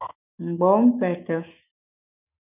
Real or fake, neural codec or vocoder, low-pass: fake; autoencoder, 48 kHz, 128 numbers a frame, DAC-VAE, trained on Japanese speech; 3.6 kHz